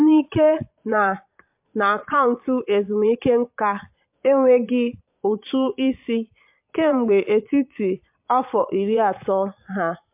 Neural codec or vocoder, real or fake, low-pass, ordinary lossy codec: vocoder, 22.05 kHz, 80 mel bands, Vocos; fake; 3.6 kHz; MP3, 32 kbps